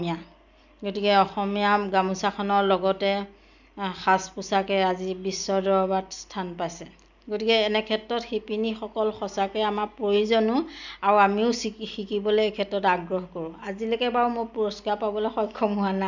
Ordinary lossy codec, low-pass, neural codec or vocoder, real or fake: none; 7.2 kHz; none; real